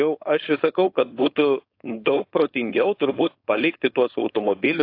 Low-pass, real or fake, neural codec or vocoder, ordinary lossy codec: 5.4 kHz; fake; codec, 16 kHz, 4.8 kbps, FACodec; AAC, 32 kbps